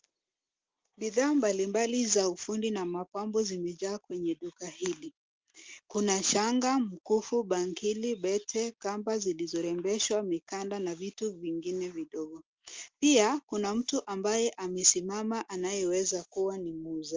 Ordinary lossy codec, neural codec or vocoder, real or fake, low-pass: Opus, 32 kbps; none; real; 7.2 kHz